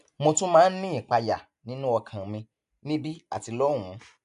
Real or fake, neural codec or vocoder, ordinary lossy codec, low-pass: real; none; none; 10.8 kHz